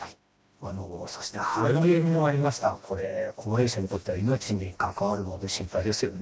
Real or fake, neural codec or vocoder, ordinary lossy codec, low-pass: fake; codec, 16 kHz, 1 kbps, FreqCodec, smaller model; none; none